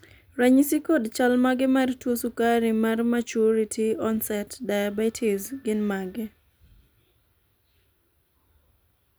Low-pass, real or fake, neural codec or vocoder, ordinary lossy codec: none; real; none; none